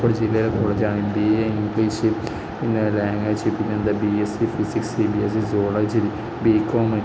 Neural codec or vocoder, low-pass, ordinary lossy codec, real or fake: none; none; none; real